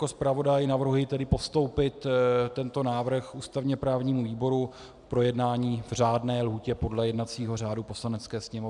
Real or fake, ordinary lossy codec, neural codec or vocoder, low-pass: real; MP3, 96 kbps; none; 10.8 kHz